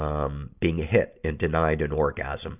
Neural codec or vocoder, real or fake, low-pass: none; real; 3.6 kHz